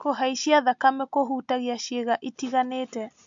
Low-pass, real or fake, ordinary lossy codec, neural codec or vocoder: 7.2 kHz; real; MP3, 64 kbps; none